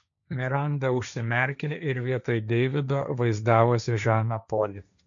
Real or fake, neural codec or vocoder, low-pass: fake; codec, 16 kHz, 1.1 kbps, Voila-Tokenizer; 7.2 kHz